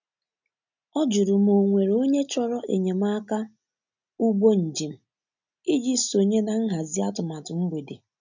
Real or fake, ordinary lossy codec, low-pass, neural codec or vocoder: real; none; 7.2 kHz; none